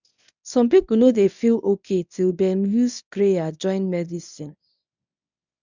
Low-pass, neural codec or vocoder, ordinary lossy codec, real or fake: 7.2 kHz; codec, 24 kHz, 0.9 kbps, WavTokenizer, medium speech release version 1; none; fake